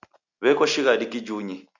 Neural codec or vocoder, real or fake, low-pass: none; real; 7.2 kHz